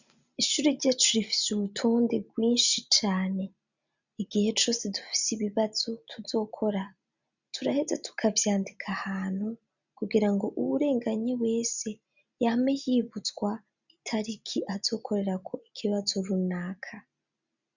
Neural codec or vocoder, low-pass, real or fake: none; 7.2 kHz; real